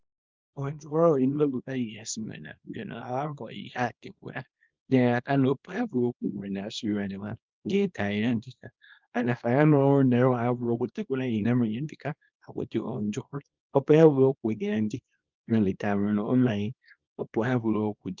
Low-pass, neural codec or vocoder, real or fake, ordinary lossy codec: 7.2 kHz; codec, 24 kHz, 0.9 kbps, WavTokenizer, small release; fake; Opus, 32 kbps